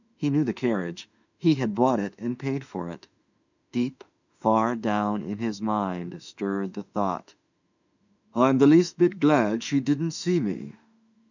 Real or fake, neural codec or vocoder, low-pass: fake; autoencoder, 48 kHz, 32 numbers a frame, DAC-VAE, trained on Japanese speech; 7.2 kHz